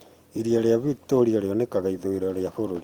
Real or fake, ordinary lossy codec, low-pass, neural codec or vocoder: fake; Opus, 24 kbps; 19.8 kHz; vocoder, 44.1 kHz, 128 mel bands every 256 samples, BigVGAN v2